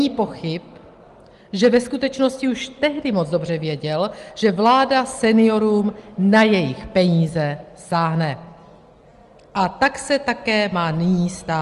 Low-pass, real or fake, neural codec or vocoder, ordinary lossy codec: 10.8 kHz; real; none; Opus, 24 kbps